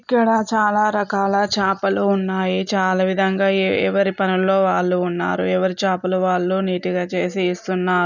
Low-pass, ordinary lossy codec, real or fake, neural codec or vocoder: 7.2 kHz; none; real; none